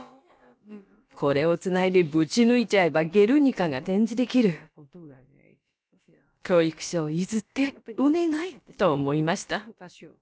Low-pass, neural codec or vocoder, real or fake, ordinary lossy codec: none; codec, 16 kHz, about 1 kbps, DyCAST, with the encoder's durations; fake; none